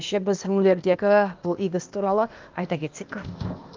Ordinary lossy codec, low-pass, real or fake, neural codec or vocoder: Opus, 24 kbps; 7.2 kHz; fake; codec, 16 kHz, 0.8 kbps, ZipCodec